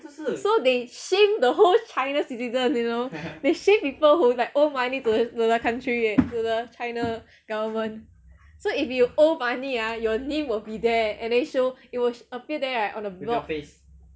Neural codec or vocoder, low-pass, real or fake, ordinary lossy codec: none; none; real; none